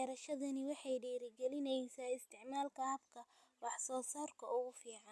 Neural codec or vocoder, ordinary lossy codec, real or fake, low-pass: none; none; real; none